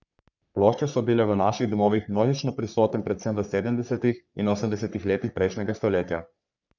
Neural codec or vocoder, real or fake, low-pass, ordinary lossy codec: codec, 44.1 kHz, 3.4 kbps, Pupu-Codec; fake; 7.2 kHz; none